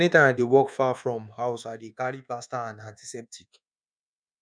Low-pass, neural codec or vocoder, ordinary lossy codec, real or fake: 9.9 kHz; codec, 24 kHz, 1.2 kbps, DualCodec; none; fake